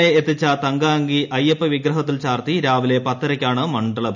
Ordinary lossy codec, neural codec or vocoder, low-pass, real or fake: none; none; 7.2 kHz; real